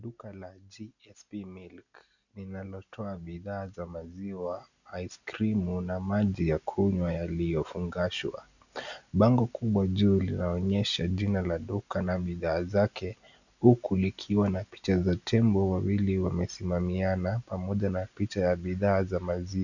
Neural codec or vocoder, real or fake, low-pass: none; real; 7.2 kHz